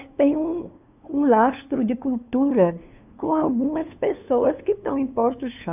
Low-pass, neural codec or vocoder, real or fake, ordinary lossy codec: 3.6 kHz; codec, 16 kHz, 2 kbps, FunCodec, trained on LibriTTS, 25 frames a second; fake; none